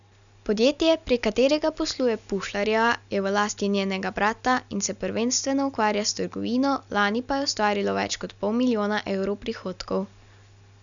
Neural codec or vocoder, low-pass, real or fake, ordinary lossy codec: none; 7.2 kHz; real; none